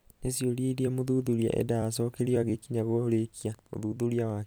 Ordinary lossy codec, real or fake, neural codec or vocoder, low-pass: none; fake; vocoder, 44.1 kHz, 128 mel bands every 256 samples, BigVGAN v2; none